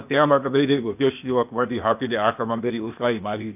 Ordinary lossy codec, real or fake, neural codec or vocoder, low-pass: none; fake; codec, 16 kHz, 0.8 kbps, ZipCodec; 3.6 kHz